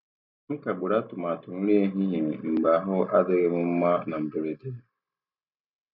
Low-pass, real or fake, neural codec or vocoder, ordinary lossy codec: 5.4 kHz; real; none; none